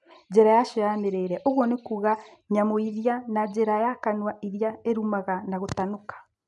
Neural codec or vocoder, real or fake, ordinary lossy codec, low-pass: none; real; none; 10.8 kHz